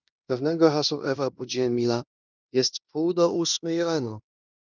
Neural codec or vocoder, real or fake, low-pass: codec, 16 kHz in and 24 kHz out, 0.9 kbps, LongCat-Audio-Codec, fine tuned four codebook decoder; fake; 7.2 kHz